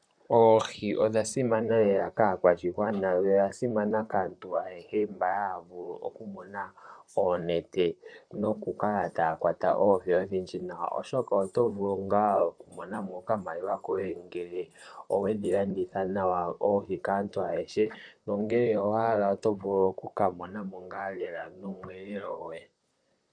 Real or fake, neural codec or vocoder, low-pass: fake; vocoder, 44.1 kHz, 128 mel bands, Pupu-Vocoder; 9.9 kHz